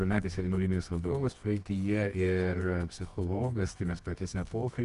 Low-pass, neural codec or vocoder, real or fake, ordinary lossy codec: 10.8 kHz; codec, 24 kHz, 0.9 kbps, WavTokenizer, medium music audio release; fake; Opus, 64 kbps